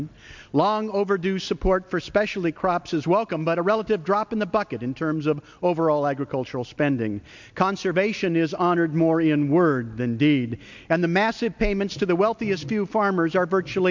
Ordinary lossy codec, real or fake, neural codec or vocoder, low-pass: MP3, 64 kbps; real; none; 7.2 kHz